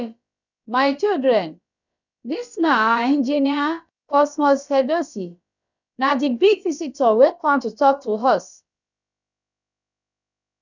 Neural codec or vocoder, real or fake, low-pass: codec, 16 kHz, about 1 kbps, DyCAST, with the encoder's durations; fake; 7.2 kHz